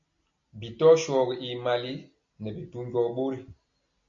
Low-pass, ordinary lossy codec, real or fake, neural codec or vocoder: 7.2 kHz; MP3, 64 kbps; real; none